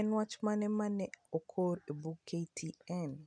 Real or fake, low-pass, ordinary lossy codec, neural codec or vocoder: real; 9.9 kHz; MP3, 96 kbps; none